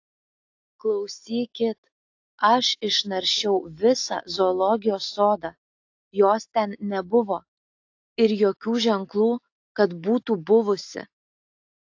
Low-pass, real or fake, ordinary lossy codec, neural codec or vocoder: 7.2 kHz; real; AAC, 48 kbps; none